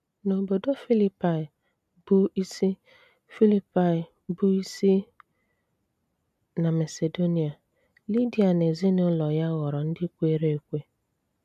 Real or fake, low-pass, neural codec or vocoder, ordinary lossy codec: real; 14.4 kHz; none; none